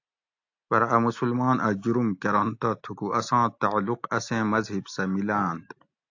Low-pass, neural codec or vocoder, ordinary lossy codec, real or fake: 7.2 kHz; none; AAC, 48 kbps; real